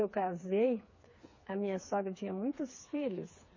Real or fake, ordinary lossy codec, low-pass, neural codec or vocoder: fake; MP3, 32 kbps; 7.2 kHz; codec, 16 kHz, 4 kbps, FreqCodec, smaller model